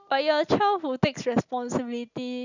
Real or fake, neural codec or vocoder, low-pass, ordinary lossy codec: real; none; 7.2 kHz; none